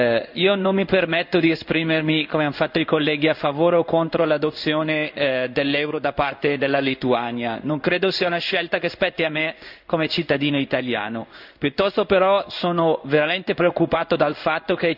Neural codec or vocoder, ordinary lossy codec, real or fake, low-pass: codec, 16 kHz in and 24 kHz out, 1 kbps, XY-Tokenizer; none; fake; 5.4 kHz